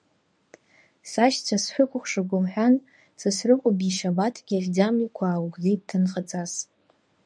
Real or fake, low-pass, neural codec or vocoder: fake; 9.9 kHz; codec, 24 kHz, 0.9 kbps, WavTokenizer, medium speech release version 1